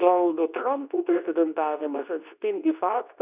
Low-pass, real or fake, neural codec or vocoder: 3.6 kHz; fake; codec, 24 kHz, 0.9 kbps, WavTokenizer, medium speech release version 2